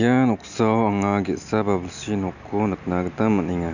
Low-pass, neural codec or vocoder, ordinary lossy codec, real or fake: 7.2 kHz; none; none; real